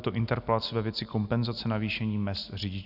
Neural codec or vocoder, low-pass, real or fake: none; 5.4 kHz; real